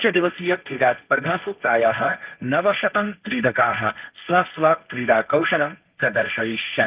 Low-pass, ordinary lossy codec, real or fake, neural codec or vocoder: 3.6 kHz; Opus, 24 kbps; fake; codec, 16 kHz, 1.1 kbps, Voila-Tokenizer